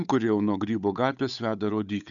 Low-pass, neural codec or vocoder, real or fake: 7.2 kHz; codec, 16 kHz, 8 kbps, FunCodec, trained on Chinese and English, 25 frames a second; fake